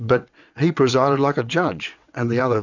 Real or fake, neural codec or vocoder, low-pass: fake; vocoder, 22.05 kHz, 80 mel bands, WaveNeXt; 7.2 kHz